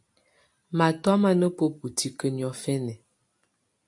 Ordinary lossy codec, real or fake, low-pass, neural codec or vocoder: AAC, 64 kbps; real; 10.8 kHz; none